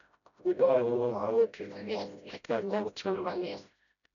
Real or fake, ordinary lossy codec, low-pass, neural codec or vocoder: fake; none; 7.2 kHz; codec, 16 kHz, 0.5 kbps, FreqCodec, smaller model